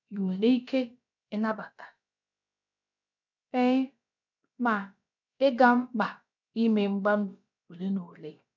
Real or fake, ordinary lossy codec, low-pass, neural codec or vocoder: fake; none; 7.2 kHz; codec, 16 kHz, about 1 kbps, DyCAST, with the encoder's durations